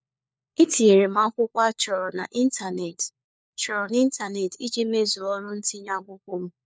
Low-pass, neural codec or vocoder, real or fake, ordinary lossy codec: none; codec, 16 kHz, 4 kbps, FunCodec, trained on LibriTTS, 50 frames a second; fake; none